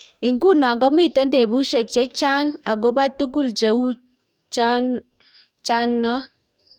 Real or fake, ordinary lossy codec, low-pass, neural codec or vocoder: fake; none; 19.8 kHz; codec, 44.1 kHz, 2.6 kbps, DAC